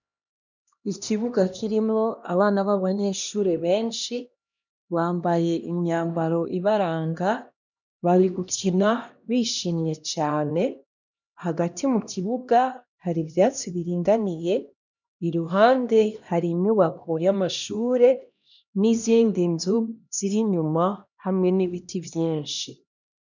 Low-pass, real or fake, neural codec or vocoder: 7.2 kHz; fake; codec, 16 kHz, 1 kbps, X-Codec, HuBERT features, trained on LibriSpeech